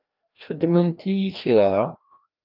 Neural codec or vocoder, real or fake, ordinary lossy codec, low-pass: codec, 16 kHz, 1 kbps, FreqCodec, larger model; fake; Opus, 24 kbps; 5.4 kHz